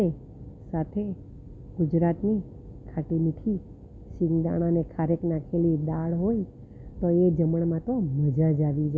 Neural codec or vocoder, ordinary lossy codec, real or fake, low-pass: none; none; real; none